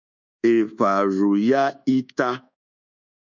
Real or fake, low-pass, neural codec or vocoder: fake; 7.2 kHz; codec, 24 kHz, 1.2 kbps, DualCodec